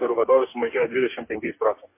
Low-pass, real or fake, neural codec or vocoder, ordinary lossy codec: 3.6 kHz; fake; codec, 44.1 kHz, 2.6 kbps, DAC; MP3, 24 kbps